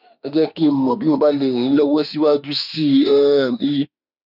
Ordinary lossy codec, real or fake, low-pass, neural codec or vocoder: none; fake; 5.4 kHz; autoencoder, 48 kHz, 32 numbers a frame, DAC-VAE, trained on Japanese speech